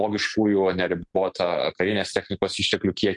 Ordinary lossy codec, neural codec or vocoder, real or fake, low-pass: MP3, 64 kbps; none; real; 10.8 kHz